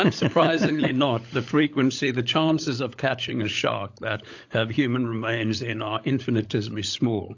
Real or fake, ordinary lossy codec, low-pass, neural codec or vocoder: fake; AAC, 48 kbps; 7.2 kHz; codec, 16 kHz, 16 kbps, FunCodec, trained on LibriTTS, 50 frames a second